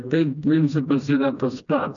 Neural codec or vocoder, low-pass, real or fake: codec, 16 kHz, 1 kbps, FreqCodec, smaller model; 7.2 kHz; fake